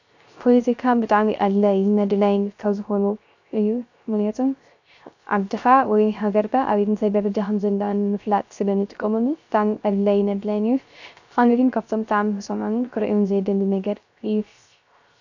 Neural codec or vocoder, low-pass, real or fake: codec, 16 kHz, 0.3 kbps, FocalCodec; 7.2 kHz; fake